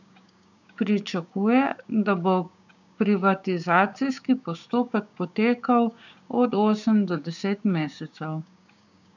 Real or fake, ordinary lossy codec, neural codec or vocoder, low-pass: fake; none; codec, 16 kHz, 6 kbps, DAC; 7.2 kHz